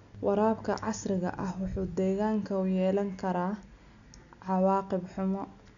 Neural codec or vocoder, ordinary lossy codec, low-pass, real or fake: none; MP3, 96 kbps; 7.2 kHz; real